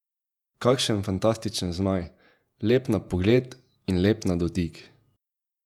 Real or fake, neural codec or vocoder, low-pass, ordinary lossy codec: fake; vocoder, 48 kHz, 128 mel bands, Vocos; 19.8 kHz; none